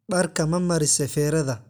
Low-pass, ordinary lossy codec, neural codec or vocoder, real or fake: none; none; none; real